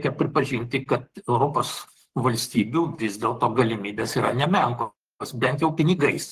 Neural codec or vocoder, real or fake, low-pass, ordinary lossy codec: codec, 44.1 kHz, 7.8 kbps, Pupu-Codec; fake; 14.4 kHz; Opus, 16 kbps